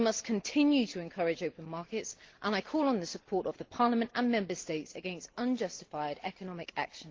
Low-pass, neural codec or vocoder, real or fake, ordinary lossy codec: 7.2 kHz; none; real; Opus, 16 kbps